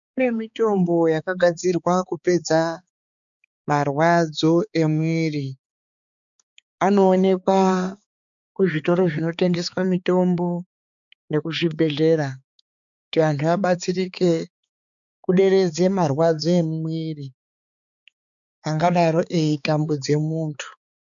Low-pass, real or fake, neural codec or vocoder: 7.2 kHz; fake; codec, 16 kHz, 4 kbps, X-Codec, HuBERT features, trained on balanced general audio